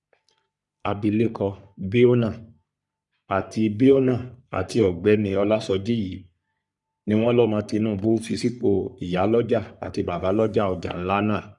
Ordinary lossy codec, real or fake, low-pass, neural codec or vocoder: none; fake; 10.8 kHz; codec, 44.1 kHz, 3.4 kbps, Pupu-Codec